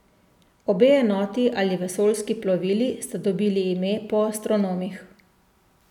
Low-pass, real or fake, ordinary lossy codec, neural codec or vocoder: 19.8 kHz; real; none; none